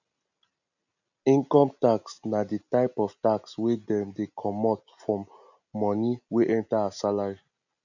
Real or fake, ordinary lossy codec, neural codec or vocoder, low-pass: real; none; none; 7.2 kHz